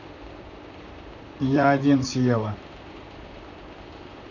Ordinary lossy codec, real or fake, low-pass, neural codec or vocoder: none; fake; 7.2 kHz; vocoder, 44.1 kHz, 128 mel bands, Pupu-Vocoder